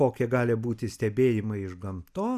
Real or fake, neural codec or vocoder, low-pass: real; none; 14.4 kHz